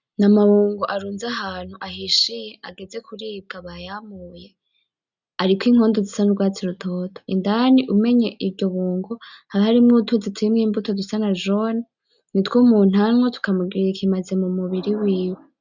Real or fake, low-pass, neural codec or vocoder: real; 7.2 kHz; none